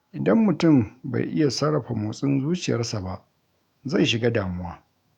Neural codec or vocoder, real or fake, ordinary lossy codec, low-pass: autoencoder, 48 kHz, 128 numbers a frame, DAC-VAE, trained on Japanese speech; fake; none; 19.8 kHz